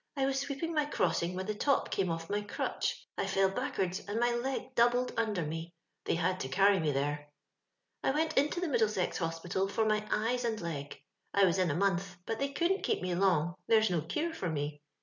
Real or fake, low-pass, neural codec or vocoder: real; 7.2 kHz; none